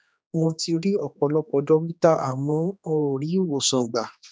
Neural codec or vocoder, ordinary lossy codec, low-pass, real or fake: codec, 16 kHz, 2 kbps, X-Codec, HuBERT features, trained on general audio; none; none; fake